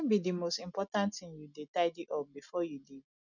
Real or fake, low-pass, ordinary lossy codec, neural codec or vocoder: real; 7.2 kHz; none; none